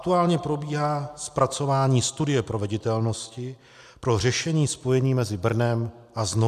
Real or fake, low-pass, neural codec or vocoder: real; 14.4 kHz; none